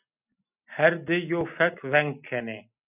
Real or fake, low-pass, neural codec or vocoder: real; 3.6 kHz; none